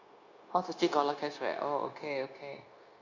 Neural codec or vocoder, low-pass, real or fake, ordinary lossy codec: codec, 16 kHz, 0.9 kbps, LongCat-Audio-Codec; 7.2 kHz; fake; Opus, 64 kbps